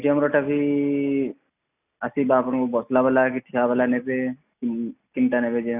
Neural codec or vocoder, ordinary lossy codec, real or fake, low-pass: none; none; real; 3.6 kHz